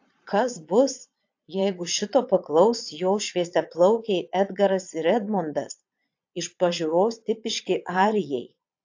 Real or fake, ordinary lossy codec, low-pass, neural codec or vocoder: fake; AAC, 48 kbps; 7.2 kHz; vocoder, 22.05 kHz, 80 mel bands, Vocos